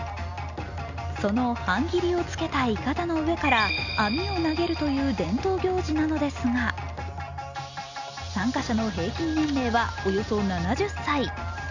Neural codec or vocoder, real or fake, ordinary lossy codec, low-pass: none; real; none; 7.2 kHz